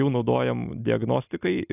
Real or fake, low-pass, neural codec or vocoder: real; 3.6 kHz; none